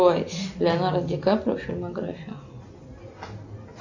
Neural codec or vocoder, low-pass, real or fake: none; 7.2 kHz; real